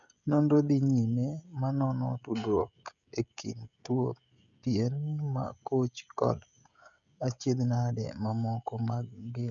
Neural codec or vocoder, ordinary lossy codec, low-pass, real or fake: codec, 16 kHz, 16 kbps, FreqCodec, smaller model; none; 7.2 kHz; fake